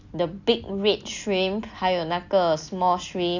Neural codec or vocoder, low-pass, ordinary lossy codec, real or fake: none; 7.2 kHz; none; real